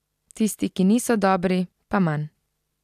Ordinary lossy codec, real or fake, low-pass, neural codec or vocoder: none; real; 14.4 kHz; none